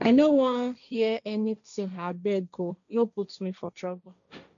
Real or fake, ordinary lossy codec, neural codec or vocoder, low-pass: fake; none; codec, 16 kHz, 1.1 kbps, Voila-Tokenizer; 7.2 kHz